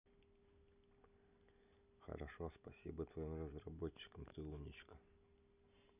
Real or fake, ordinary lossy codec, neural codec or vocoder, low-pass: real; none; none; 3.6 kHz